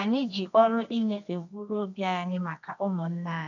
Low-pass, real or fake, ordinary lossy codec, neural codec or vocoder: 7.2 kHz; fake; none; codec, 24 kHz, 0.9 kbps, WavTokenizer, medium music audio release